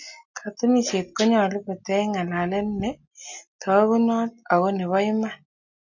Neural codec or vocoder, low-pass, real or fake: none; 7.2 kHz; real